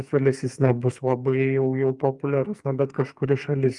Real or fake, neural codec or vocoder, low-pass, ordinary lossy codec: fake; codec, 44.1 kHz, 2.6 kbps, SNAC; 10.8 kHz; Opus, 32 kbps